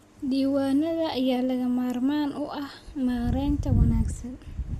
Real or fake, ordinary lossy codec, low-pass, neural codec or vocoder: real; MP3, 64 kbps; 14.4 kHz; none